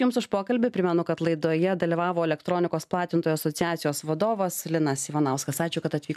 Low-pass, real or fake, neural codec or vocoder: 14.4 kHz; real; none